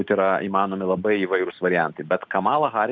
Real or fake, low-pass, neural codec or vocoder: real; 7.2 kHz; none